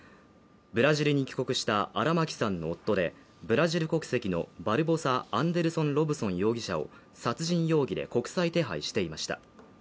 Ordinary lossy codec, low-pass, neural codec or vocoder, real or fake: none; none; none; real